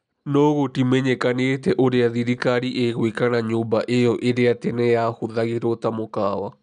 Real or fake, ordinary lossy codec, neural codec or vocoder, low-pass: real; MP3, 96 kbps; none; 10.8 kHz